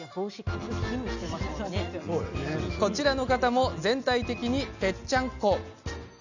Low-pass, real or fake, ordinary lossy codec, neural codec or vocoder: 7.2 kHz; real; none; none